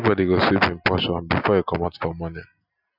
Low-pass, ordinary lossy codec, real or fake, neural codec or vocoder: 5.4 kHz; none; real; none